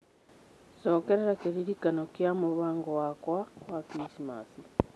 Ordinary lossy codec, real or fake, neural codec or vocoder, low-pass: none; real; none; none